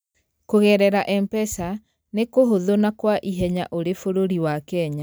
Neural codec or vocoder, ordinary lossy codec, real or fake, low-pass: none; none; real; none